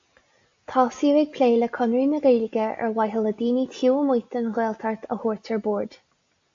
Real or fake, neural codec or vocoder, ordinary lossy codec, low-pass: real; none; AAC, 48 kbps; 7.2 kHz